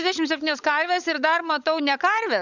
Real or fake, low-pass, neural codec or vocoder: fake; 7.2 kHz; codec, 16 kHz, 16 kbps, FunCodec, trained on LibriTTS, 50 frames a second